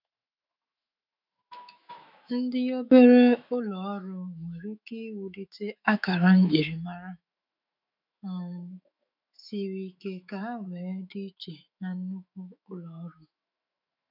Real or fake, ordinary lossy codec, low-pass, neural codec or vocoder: fake; none; 5.4 kHz; autoencoder, 48 kHz, 128 numbers a frame, DAC-VAE, trained on Japanese speech